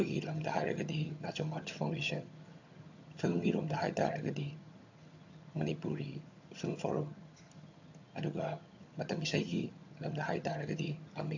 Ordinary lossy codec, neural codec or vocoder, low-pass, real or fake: AAC, 48 kbps; vocoder, 22.05 kHz, 80 mel bands, HiFi-GAN; 7.2 kHz; fake